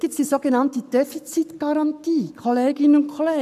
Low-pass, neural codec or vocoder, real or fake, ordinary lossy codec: 14.4 kHz; codec, 44.1 kHz, 7.8 kbps, Pupu-Codec; fake; none